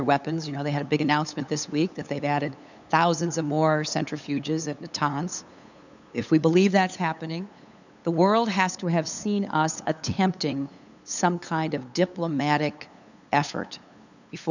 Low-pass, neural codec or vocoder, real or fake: 7.2 kHz; codec, 16 kHz, 8 kbps, FunCodec, trained on LibriTTS, 25 frames a second; fake